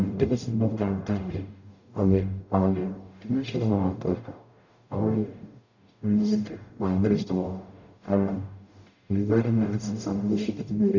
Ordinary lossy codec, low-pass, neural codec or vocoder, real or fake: none; 7.2 kHz; codec, 44.1 kHz, 0.9 kbps, DAC; fake